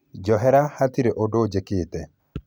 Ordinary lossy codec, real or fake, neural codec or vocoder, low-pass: none; real; none; 19.8 kHz